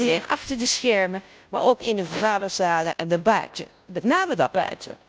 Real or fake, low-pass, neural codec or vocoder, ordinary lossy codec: fake; none; codec, 16 kHz, 0.5 kbps, FunCodec, trained on Chinese and English, 25 frames a second; none